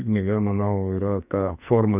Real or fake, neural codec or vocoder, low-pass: fake; codec, 44.1 kHz, 2.6 kbps, SNAC; 3.6 kHz